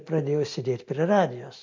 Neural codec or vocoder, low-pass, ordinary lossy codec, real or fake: none; 7.2 kHz; MP3, 48 kbps; real